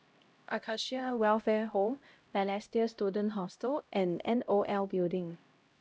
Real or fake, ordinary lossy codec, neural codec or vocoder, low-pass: fake; none; codec, 16 kHz, 0.5 kbps, X-Codec, HuBERT features, trained on LibriSpeech; none